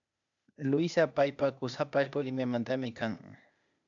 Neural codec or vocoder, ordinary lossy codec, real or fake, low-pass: codec, 16 kHz, 0.8 kbps, ZipCodec; AAC, 64 kbps; fake; 7.2 kHz